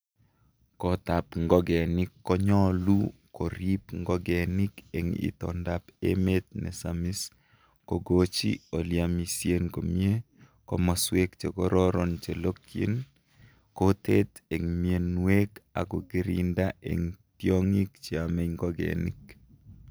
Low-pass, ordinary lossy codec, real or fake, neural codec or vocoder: none; none; real; none